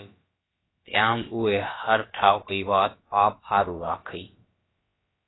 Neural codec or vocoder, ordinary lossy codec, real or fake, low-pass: codec, 16 kHz, about 1 kbps, DyCAST, with the encoder's durations; AAC, 16 kbps; fake; 7.2 kHz